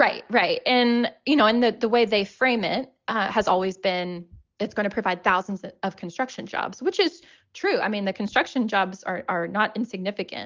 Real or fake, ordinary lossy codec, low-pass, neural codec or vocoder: real; Opus, 32 kbps; 7.2 kHz; none